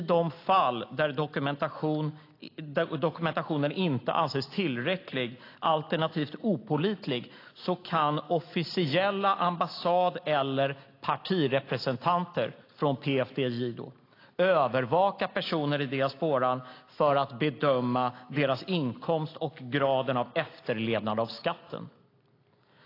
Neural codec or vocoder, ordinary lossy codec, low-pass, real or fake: none; AAC, 32 kbps; 5.4 kHz; real